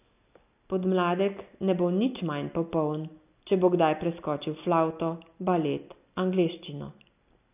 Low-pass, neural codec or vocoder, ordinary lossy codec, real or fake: 3.6 kHz; none; AAC, 32 kbps; real